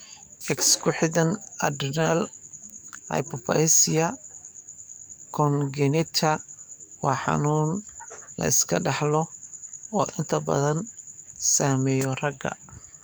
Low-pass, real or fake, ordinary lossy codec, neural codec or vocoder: none; fake; none; codec, 44.1 kHz, 7.8 kbps, DAC